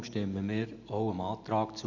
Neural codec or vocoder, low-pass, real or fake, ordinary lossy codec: none; 7.2 kHz; real; none